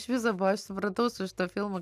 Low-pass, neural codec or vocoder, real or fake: 14.4 kHz; none; real